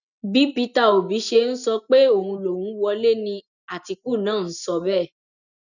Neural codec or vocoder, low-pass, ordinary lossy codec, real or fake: none; 7.2 kHz; none; real